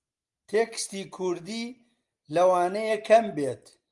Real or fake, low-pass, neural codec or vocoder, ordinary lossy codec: real; 10.8 kHz; none; Opus, 32 kbps